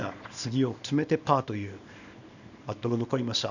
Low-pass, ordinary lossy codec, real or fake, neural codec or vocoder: 7.2 kHz; none; fake; codec, 24 kHz, 0.9 kbps, WavTokenizer, small release